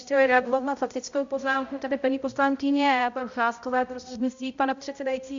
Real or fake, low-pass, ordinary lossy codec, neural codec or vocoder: fake; 7.2 kHz; Opus, 64 kbps; codec, 16 kHz, 0.5 kbps, X-Codec, HuBERT features, trained on balanced general audio